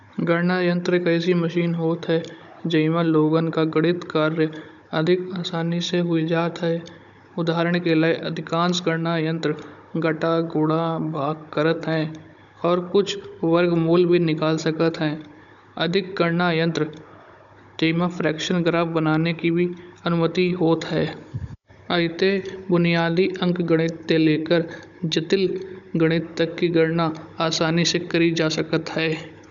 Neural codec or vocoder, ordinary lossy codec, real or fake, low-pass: codec, 16 kHz, 16 kbps, FunCodec, trained on Chinese and English, 50 frames a second; none; fake; 7.2 kHz